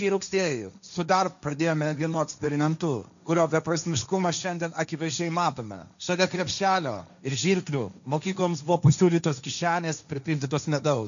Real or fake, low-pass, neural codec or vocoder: fake; 7.2 kHz; codec, 16 kHz, 1.1 kbps, Voila-Tokenizer